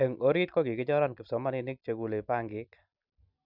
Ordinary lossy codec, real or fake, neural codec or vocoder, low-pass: none; real; none; 5.4 kHz